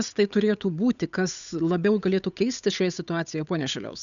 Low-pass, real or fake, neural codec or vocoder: 7.2 kHz; fake; codec, 16 kHz, 8 kbps, FunCodec, trained on Chinese and English, 25 frames a second